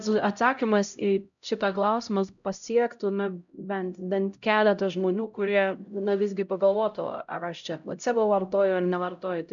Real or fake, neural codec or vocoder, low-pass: fake; codec, 16 kHz, 0.5 kbps, X-Codec, HuBERT features, trained on LibriSpeech; 7.2 kHz